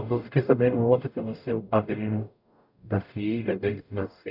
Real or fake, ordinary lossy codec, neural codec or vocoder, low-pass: fake; none; codec, 44.1 kHz, 0.9 kbps, DAC; 5.4 kHz